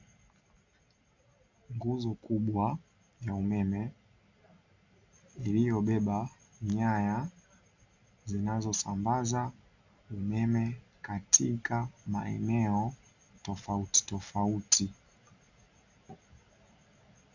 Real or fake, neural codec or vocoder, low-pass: real; none; 7.2 kHz